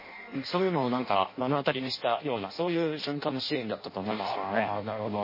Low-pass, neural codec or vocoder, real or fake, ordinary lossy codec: 5.4 kHz; codec, 16 kHz in and 24 kHz out, 0.6 kbps, FireRedTTS-2 codec; fake; MP3, 24 kbps